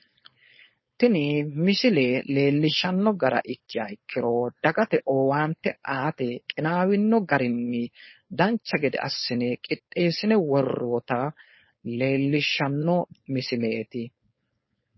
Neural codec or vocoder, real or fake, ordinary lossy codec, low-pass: codec, 16 kHz, 4.8 kbps, FACodec; fake; MP3, 24 kbps; 7.2 kHz